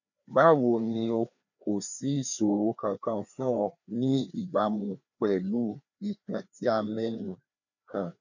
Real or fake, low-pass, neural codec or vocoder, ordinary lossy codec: fake; 7.2 kHz; codec, 16 kHz, 2 kbps, FreqCodec, larger model; none